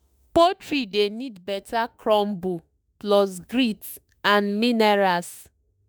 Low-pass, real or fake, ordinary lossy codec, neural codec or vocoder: none; fake; none; autoencoder, 48 kHz, 32 numbers a frame, DAC-VAE, trained on Japanese speech